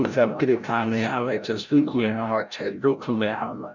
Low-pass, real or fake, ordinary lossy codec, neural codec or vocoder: 7.2 kHz; fake; none; codec, 16 kHz, 0.5 kbps, FreqCodec, larger model